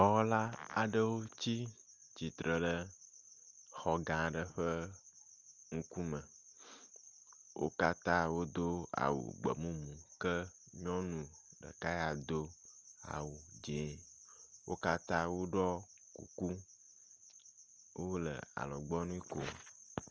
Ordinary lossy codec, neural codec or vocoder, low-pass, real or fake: Opus, 24 kbps; none; 7.2 kHz; real